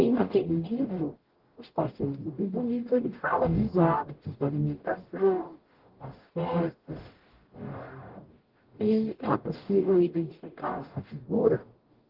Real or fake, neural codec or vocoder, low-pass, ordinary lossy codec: fake; codec, 44.1 kHz, 0.9 kbps, DAC; 5.4 kHz; Opus, 16 kbps